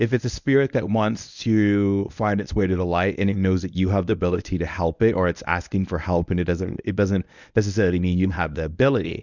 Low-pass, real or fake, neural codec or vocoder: 7.2 kHz; fake; codec, 24 kHz, 0.9 kbps, WavTokenizer, medium speech release version 1